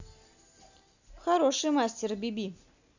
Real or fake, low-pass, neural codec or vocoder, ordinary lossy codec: real; 7.2 kHz; none; none